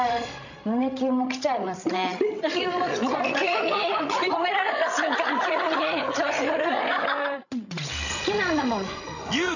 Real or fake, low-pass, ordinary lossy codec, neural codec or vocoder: fake; 7.2 kHz; none; codec, 16 kHz, 16 kbps, FreqCodec, larger model